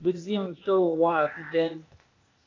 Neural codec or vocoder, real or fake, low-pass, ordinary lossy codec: codec, 16 kHz, 0.8 kbps, ZipCodec; fake; 7.2 kHz; AAC, 32 kbps